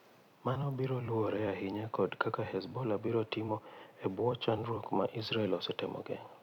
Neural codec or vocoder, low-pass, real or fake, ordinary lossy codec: vocoder, 44.1 kHz, 128 mel bands every 256 samples, BigVGAN v2; 19.8 kHz; fake; none